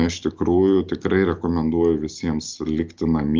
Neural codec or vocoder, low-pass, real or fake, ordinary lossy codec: none; 7.2 kHz; real; Opus, 24 kbps